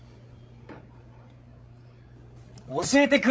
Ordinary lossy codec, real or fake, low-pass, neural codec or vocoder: none; fake; none; codec, 16 kHz, 16 kbps, FreqCodec, larger model